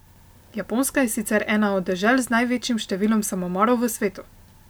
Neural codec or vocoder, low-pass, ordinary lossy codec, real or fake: none; none; none; real